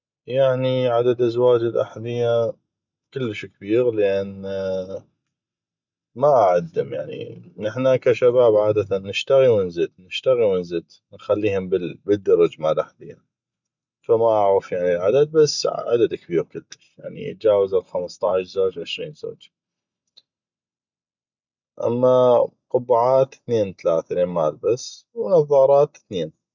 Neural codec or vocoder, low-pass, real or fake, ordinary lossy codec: none; 7.2 kHz; real; none